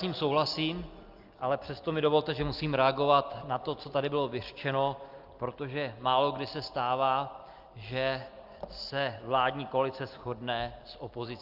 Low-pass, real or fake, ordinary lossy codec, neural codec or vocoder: 5.4 kHz; real; Opus, 32 kbps; none